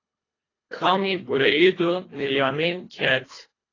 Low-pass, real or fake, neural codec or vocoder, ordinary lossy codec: 7.2 kHz; fake; codec, 24 kHz, 1.5 kbps, HILCodec; AAC, 32 kbps